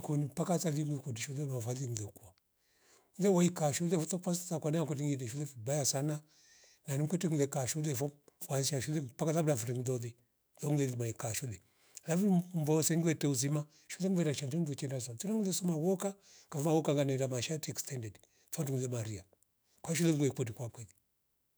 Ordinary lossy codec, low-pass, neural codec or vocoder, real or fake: none; none; autoencoder, 48 kHz, 128 numbers a frame, DAC-VAE, trained on Japanese speech; fake